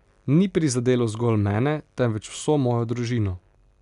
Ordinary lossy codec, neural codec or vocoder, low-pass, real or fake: none; none; 10.8 kHz; real